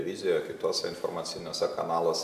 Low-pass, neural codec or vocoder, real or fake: 14.4 kHz; none; real